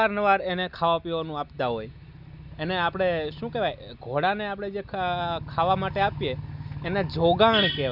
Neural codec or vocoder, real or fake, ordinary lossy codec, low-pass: none; real; none; 5.4 kHz